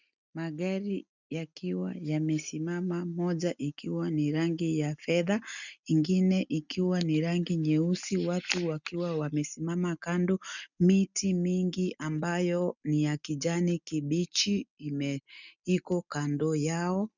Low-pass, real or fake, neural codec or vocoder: 7.2 kHz; real; none